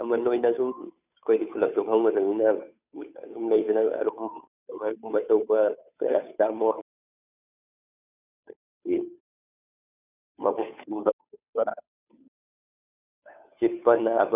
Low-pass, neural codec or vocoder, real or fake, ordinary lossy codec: 3.6 kHz; codec, 16 kHz, 2 kbps, FunCodec, trained on Chinese and English, 25 frames a second; fake; none